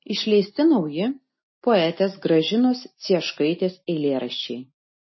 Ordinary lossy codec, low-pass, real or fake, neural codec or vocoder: MP3, 24 kbps; 7.2 kHz; real; none